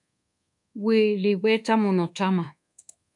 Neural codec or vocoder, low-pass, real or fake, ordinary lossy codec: codec, 24 kHz, 1.2 kbps, DualCodec; 10.8 kHz; fake; MP3, 96 kbps